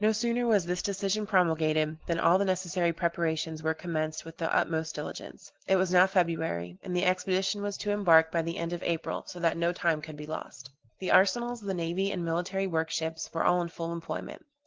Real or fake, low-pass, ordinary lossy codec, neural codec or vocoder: real; 7.2 kHz; Opus, 16 kbps; none